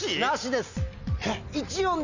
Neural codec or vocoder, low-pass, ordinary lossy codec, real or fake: none; 7.2 kHz; none; real